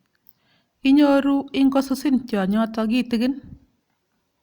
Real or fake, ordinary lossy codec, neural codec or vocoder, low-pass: real; Opus, 64 kbps; none; 19.8 kHz